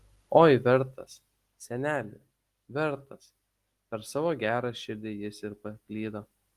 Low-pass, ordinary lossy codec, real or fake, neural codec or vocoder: 14.4 kHz; Opus, 24 kbps; real; none